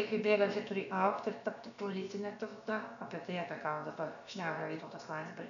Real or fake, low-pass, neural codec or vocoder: fake; 7.2 kHz; codec, 16 kHz, about 1 kbps, DyCAST, with the encoder's durations